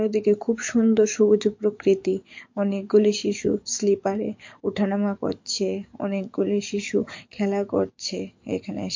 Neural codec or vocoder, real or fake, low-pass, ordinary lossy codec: codec, 44.1 kHz, 7.8 kbps, DAC; fake; 7.2 kHz; MP3, 48 kbps